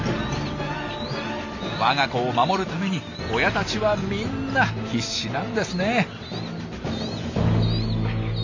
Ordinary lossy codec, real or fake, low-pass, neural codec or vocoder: AAC, 48 kbps; real; 7.2 kHz; none